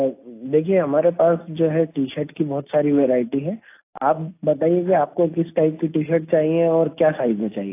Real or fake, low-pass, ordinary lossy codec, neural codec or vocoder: real; 3.6 kHz; AAC, 24 kbps; none